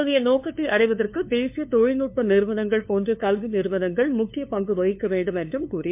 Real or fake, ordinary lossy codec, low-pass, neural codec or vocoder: fake; MP3, 32 kbps; 3.6 kHz; codec, 16 kHz, 2 kbps, FunCodec, trained on LibriTTS, 25 frames a second